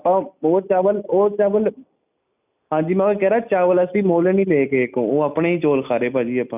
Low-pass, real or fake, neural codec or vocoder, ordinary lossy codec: 3.6 kHz; real; none; none